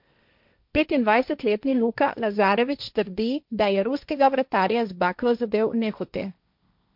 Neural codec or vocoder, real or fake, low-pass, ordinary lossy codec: codec, 16 kHz, 1.1 kbps, Voila-Tokenizer; fake; 5.4 kHz; MP3, 48 kbps